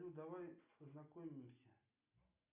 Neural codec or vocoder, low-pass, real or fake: none; 3.6 kHz; real